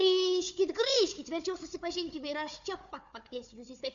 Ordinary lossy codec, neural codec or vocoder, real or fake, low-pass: AAC, 64 kbps; codec, 16 kHz, 4 kbps, FunCodec, trained on Chinese and English, 50 frames a second; fake; 7.2 kHz